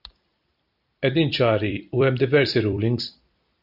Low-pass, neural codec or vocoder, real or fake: 5.4 kHz; vocoder, 44.1 kHz, 128 mel bands every 256 samples, BigVGAN v2; fake